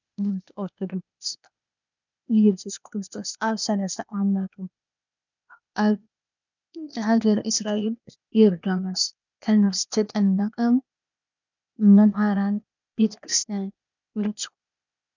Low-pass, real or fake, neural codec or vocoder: 7.2 kHz; fake; codec, 16 kHz, 0.8 kbps, ZipCodec